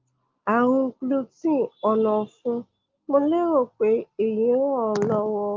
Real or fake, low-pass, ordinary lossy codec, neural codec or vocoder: real; 7.2 kHz; Opus, 32 kbps; none